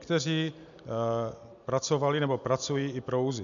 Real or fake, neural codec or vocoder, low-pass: real; none; 7.2 kHz